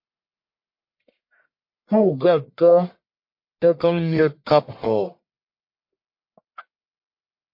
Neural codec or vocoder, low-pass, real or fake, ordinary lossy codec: codec, 44.1 kHz, 1.7 kbps, Pupu-Codec; 5.4 kHz; fake; MP3, 32 kbps